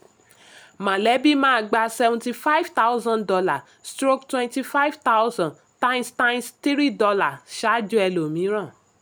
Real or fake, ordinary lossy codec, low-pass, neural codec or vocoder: real; none; none; none